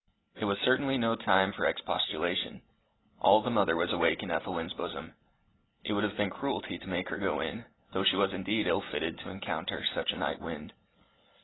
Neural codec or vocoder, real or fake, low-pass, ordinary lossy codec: none; real; 7.2 kHz; AAC, 16 kbps